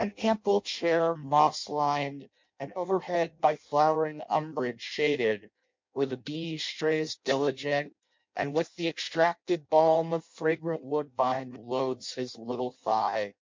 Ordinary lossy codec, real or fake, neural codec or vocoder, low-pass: MP3, 48 kbps; fake; codec, 16 kHz in and 24 kHz out, 0.6 kbps, FireRedTTS-2 codec; 7.2 kHz